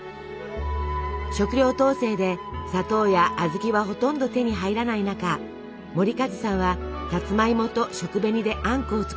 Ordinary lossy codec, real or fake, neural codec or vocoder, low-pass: none; real; none; none